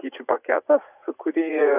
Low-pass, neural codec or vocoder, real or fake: 3.6 kHz; vocoder, 44.1 kHz, 80 mel bands, Vocos; fake